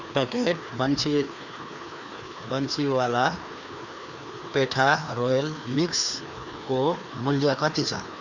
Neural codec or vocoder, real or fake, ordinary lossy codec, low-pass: codec, 16 kHz, 2 kbps, FreqCodec, larger model; fake; none; 7.2 kHz